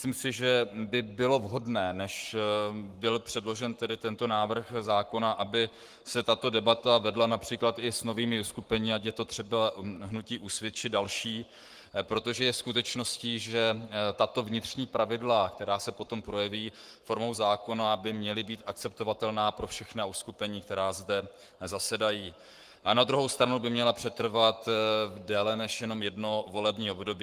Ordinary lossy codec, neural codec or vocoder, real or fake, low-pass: Opus, 24 kbps; codec, 44.1 kHz, 7.8 kbps, Pupu-Codec; fake; 14.4 kHz